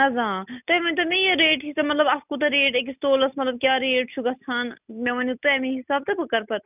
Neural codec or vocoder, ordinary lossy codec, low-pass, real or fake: none; none; 3.6 kHz; real